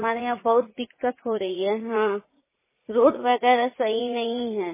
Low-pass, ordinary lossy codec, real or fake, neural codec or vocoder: 3.6 kHz; MP3, 16 kbps; fake; vocoder, 44.1 kHz, 80 mel bands, Vocos